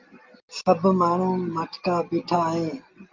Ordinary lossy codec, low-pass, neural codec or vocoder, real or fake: Opus, 32 kbps; 7.2 kHz; none; real